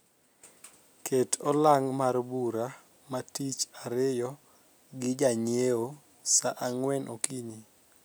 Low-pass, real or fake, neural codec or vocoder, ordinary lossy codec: none; real; none; none